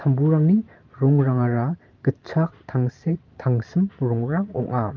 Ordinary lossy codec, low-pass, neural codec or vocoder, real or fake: Opus, 32 kbps; 7.2 kHz; vocoder, 44.1 kHz, 128 mel bands every 512 samples, BigVGAN v2; fake